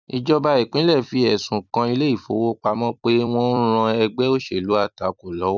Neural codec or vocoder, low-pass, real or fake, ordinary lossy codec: vocoder, 44.1 kHz, 128 mel bands every 256 samples, BigVGAN v2; 7.2 kHz; fake; none